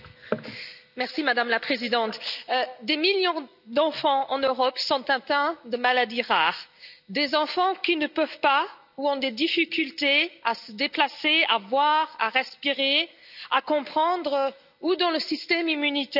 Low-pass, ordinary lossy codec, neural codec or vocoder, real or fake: 5.4 kHz; none; none; real